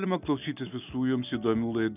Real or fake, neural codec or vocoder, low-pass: real; none; 3.6 kHz